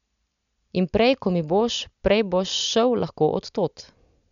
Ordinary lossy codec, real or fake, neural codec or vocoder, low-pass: none; real; none; 7.2 kHz